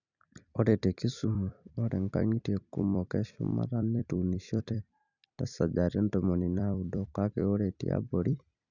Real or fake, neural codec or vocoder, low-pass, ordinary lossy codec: fake; vocoder, 44.1 kHz, 128 mel bands every 256 samples, BigVGAN v2; 7.2 kHz; none